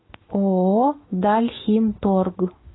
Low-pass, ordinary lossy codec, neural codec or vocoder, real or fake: 7.2 kHz; AAC, 16 kbps; autoencoder, 48 kHz, 128 numbers a frame, DAC-VAE, trained on Japanese speech; fake